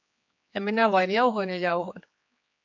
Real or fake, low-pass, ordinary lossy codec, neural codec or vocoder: fake; 7.2 kHz; MP3, 48 kbps; codec, 16 kHz, 4 kbps, X-Codec, HuBERT features, trained on general audio